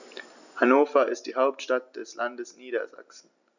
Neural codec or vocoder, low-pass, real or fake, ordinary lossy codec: none; 7.2 kHz; real; none